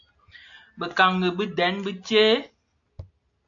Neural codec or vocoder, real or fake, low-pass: none; real; 7.2 kHz